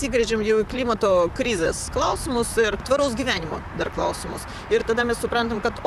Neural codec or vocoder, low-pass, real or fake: vocoder, 44.1 kHz, 128 mel bands, Pupu-Vocoder; 14.4 kHz; fake